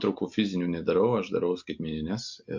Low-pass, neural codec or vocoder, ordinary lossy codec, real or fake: 7.2 kHz; none; MP3, 48 kbps; real